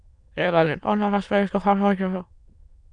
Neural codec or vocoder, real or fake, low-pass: autoencoder, 22.05 kHz, a latent of 192 numbers a frame, VITS, trained on many speakers; fake; 9.9 kHz